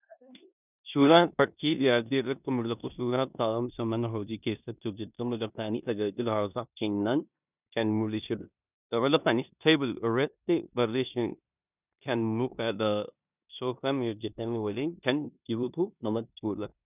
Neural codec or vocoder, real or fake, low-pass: codec, 16 kHz in and 24 kHz out, 0.9 kbps, LongCat-Audio-Codec, four codebook decoder; fake; 3.6 kHz